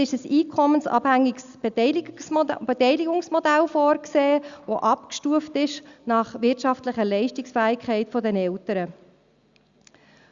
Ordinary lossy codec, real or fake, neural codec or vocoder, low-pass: Opus, 64 kbps; real; none; 7.2 kHz